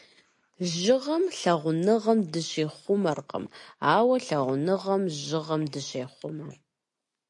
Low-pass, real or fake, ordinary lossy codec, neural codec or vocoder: 10.8 kHz; real; MP3, 48 kbps; none